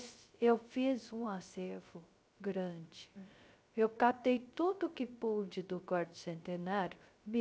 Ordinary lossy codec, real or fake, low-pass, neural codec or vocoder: none; fake; none; codec, 16 kHz, 0.3 kbps, FocalCodec